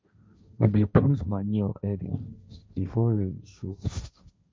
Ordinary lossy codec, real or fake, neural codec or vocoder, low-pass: none; fake; codec, 16 kHz, 1.1 kbps, Voila-Tokenizer; none